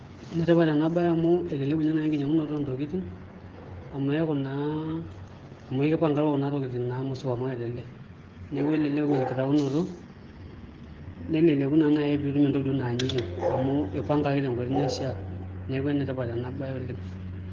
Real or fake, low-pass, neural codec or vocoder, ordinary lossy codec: fake; 7.2 kHz; codec, 16 kHz, 8 kbps, FreqCodec, smaller model; Opus, 16 kbps